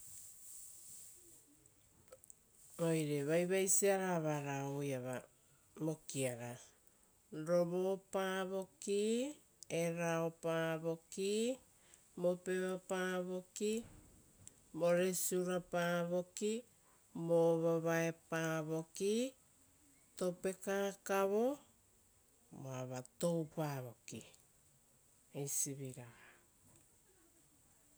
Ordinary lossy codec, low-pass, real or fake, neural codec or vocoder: none; none; real; none